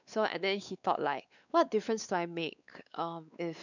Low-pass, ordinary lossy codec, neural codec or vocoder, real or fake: 7.2 kHz; none; codec, 16 kHz, 4 kbps, X-Codec, WavLM features, trained on Multilingual LibriSpeech; fake